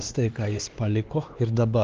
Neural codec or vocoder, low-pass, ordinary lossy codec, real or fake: codec, 16 kHz, 4 kbps, X-Codec, HuBERT features, trained on LibriSpeech; 7.2 kHz; Opus, 16 kbps; fake